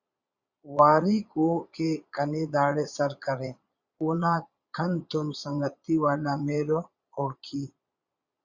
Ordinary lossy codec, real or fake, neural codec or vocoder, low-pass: Opus, 64 kbps; fake; vocoder, 22.05 kHz, 80 mel bands, Vocos; 7.2 kHz